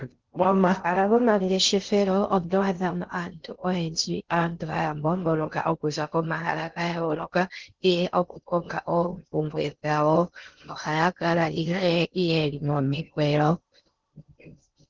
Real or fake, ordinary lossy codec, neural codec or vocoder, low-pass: fake; Opus, 16 kbps; codec, 16 kHz in and 24 kHz out, 0.6 kbps, FocalCodec, streaming, 2048 codes; 7.2 kHz